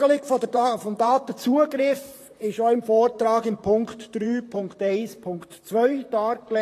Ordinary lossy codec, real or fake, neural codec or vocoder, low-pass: AAC, 48 kbps; fake; vocoder, 44.1 kHz, 128 mel bands, Pupu-Vocoder; 14.4 kHz